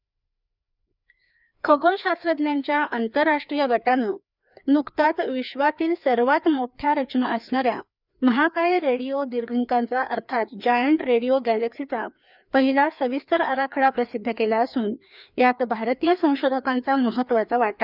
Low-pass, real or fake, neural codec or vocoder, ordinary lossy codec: 5.4 kHz; fake; codec, 16 kHz, 2 kbps, FreqCodec, larger model; none